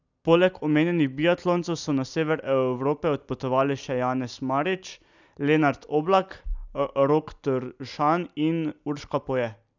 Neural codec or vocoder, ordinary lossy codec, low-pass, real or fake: none; none; 7.2 kHz; real